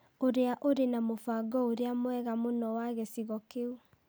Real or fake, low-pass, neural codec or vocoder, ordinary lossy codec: real; none; none; none